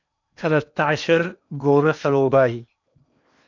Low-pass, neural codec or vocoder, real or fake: 7.2 kHz; codec, 16 kHz in and 24 kHz out, 0.8 kbps, FocalCodec, streaming, 65536 codes; fake